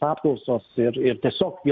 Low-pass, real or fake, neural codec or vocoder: 7.2 kHz; real; none